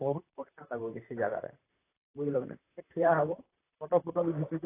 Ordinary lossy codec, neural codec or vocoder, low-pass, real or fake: AAC, 24 kbps; vocoder, 44.1 kHz, 128 mel bands, Pupu-Vocoder; 3.6 kHz; fake